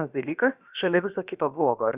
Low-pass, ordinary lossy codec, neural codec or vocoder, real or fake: 3.6 kHz; Opus, 64 kbps; codec, 16 kHz, about 1 kbps, DyCAST, with the encoder's durations; fake